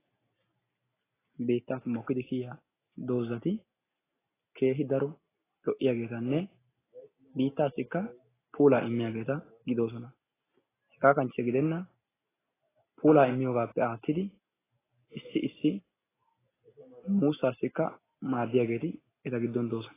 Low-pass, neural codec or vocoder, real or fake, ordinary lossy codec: 3.6 kHz; none; real; AAC, 16 kbps